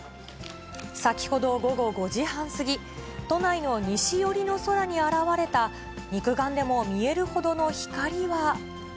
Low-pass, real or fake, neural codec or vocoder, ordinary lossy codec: none; real; none; none